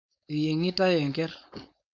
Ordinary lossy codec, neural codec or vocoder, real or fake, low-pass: none; codec, 16 kHz, 4.8 kbps, FACodec; fake; 7.2 kHz